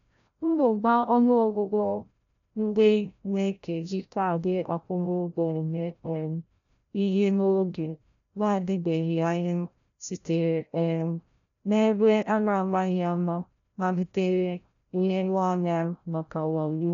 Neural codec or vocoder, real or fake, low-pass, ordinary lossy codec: codec, 16 kHz, 0.5 kbps, FreqCodec, larger model; fake; 7.2 kHz; none